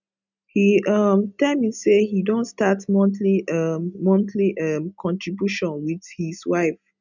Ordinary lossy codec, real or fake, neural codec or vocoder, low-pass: none; real; none; 7.2 kHz